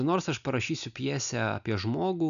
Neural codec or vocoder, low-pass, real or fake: none; 7.2 kHz; real